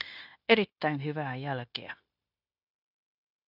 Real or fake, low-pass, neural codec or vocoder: fake; 5.4 kHz; codec, 16 kHz, 0.9 kbps, LongCat-Audio-Codec